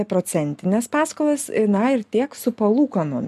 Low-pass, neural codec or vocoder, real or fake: 14.4 kHz; none; real